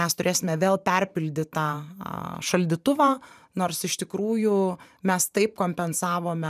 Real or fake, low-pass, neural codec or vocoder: fake; 14.4 kHz; vocoder, 44.1 kHz, 128 mel bands every 512 samples, BigVGAN v2